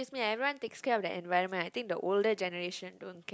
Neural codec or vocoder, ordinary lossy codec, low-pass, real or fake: none; none; none; real